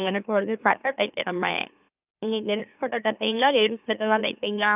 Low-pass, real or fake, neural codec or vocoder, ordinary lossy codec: 3.6 kHz; fake; autoencoder, 44.1 kHz, a latent of 192 numbers a frame, MeloTTS; none